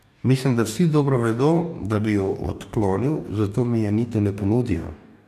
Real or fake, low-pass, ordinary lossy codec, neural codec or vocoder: fake; 14.4 kHz; none; codec, 44.1 kHz, 2.6 kbps, DAC